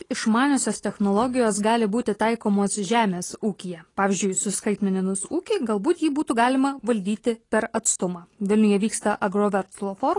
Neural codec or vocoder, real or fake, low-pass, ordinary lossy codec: none; real; 10.8 kHz; AAC, 32 kbps